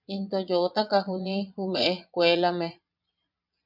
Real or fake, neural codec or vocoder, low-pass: fake; vocoder, 22.05 kHz, 80 mel bands, Vocos; 5.4 kHz